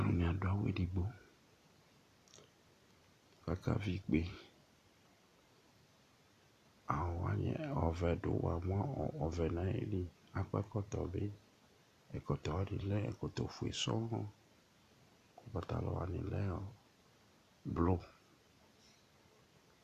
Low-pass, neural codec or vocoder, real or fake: 14.4 kHz; none; real